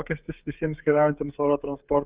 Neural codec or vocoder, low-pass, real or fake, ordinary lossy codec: codec, 16 kHz, 16 kbps, FreqCodec, smaller model; 3.6 kHz; fake; Opus, 24 kbps